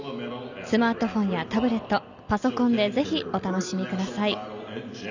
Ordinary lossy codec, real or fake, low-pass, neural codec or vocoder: none; real; 7.2 kHz; none